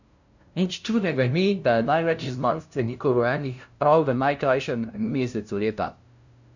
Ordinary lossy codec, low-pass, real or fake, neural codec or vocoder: none; 7.2 kHz; fake; codec, 16 kHz, 0.5 kbps, FunCodec, trained on LibriTTS, 25 frames a second